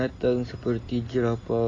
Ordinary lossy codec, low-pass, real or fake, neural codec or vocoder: AAC, 32 kbps; 9.9 kHz; real; none